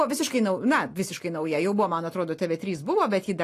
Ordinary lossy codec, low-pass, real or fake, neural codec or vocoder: AAC, 48 kbps; 14.4 kHz; real; none